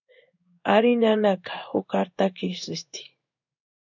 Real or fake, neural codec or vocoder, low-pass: fake; codec, 16 kHz in and 24 kHz out, 1 kbps, XY-Tokenizer; 7.2 kHz